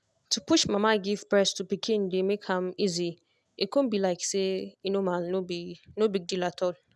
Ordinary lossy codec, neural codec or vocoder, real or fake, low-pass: none; none; real; none